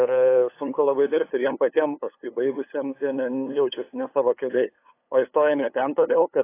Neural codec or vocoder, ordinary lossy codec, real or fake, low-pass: codec, 16 kHz, 8 kbps, FunCodec, trained on LibriTTS, 25 frames a second; AAC, 24 kbps; fake; 3.6 kHz